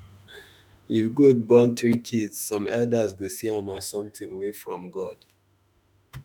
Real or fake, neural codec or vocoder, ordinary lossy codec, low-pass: fake; autoencoder, 48 kHz, 32 numbers a frame, DAC-VAE, trained on Japanese speech; none; none